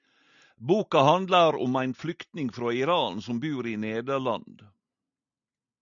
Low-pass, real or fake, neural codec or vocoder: 7.2 kHz; real; none